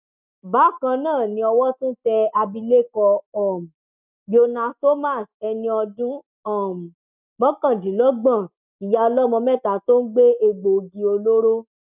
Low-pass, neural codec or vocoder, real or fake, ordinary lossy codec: 3.6 kHz; none; real; none